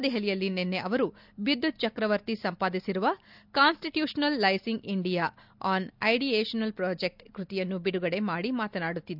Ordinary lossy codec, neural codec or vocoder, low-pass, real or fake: none; none; 5.4 kHz; real